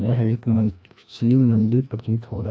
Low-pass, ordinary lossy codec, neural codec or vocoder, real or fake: none; none; codec, 16 kHz, 1 kbps, FreqCodec, larger model; fake